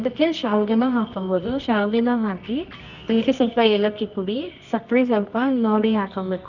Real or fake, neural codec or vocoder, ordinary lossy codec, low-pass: fake; codec, 24 kHz, 0.9 kbps, WavTokenizer, medium music audio release; none; 7.2 kHz